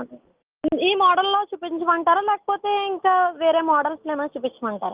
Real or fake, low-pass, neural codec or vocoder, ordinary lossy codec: real; 3.6 kHz; none; Opus, 32 kbps